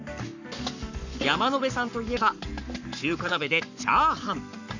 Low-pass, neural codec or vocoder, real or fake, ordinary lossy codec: 7.2 kHz; codec, 44.1 kHz, 7.8 kbps, Pupu-Codec; fake; none